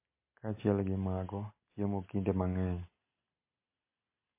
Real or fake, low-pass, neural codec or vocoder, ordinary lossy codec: real; 3.6 kHz; none; MP3, 24 kbps